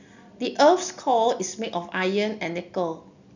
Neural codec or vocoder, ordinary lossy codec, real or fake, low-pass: none; none; real; 7.2 kHz